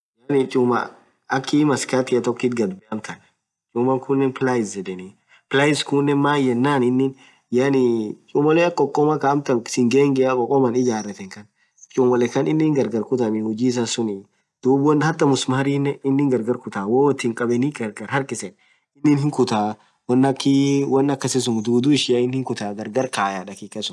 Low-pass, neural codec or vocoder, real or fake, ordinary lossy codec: none; none; real; none